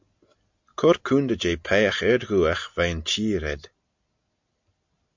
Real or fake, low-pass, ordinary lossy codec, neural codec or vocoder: real; 7.2 kHz; MP3, 64 kbps; none